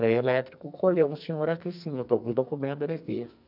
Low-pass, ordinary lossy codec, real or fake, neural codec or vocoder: 5.4 kHz; none; fake; codec, 44.1 kHz, 2.6 kbps, SNAC